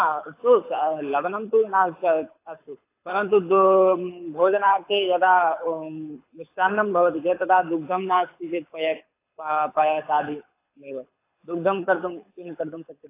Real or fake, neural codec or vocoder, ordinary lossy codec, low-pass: fake; codec, 24 kHz, 6 kbps, HILCodec; AAC, 24 kbps; 3.6 kHz